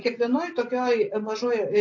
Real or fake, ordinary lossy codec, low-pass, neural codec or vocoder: real; MP3, 32 kbps; 7.2 kHz; none